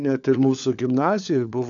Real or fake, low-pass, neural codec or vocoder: fake; 7.2 kHz; codec, 16 kHz, 4 kbps, FunCodec, trained on LibriTTS, 50 frames a second